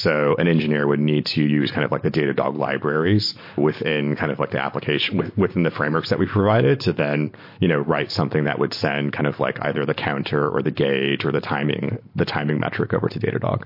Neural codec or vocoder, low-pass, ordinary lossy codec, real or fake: none; 5.4 kHz; MP3, 32 kbps; real